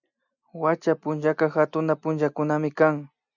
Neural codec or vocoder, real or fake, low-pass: none; real; 7.2 kHz